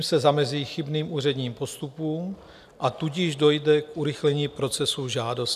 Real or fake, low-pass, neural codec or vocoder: real; 14.4 kHz; none